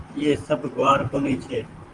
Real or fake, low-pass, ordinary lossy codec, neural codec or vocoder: fake; 10.8 kHz; Opus, 32 kbps; vocoder, 44.1 kHz, 128 mel bands, Pupu-Vocoder